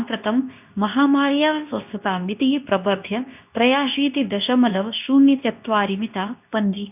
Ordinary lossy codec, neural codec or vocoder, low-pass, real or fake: none; codec, 24 kHz, 0.9 kbps, WavTokenizer, medium speech release version 2; 3.6 kHz; fake